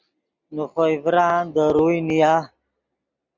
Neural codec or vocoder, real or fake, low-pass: none; real; 7.2 kHz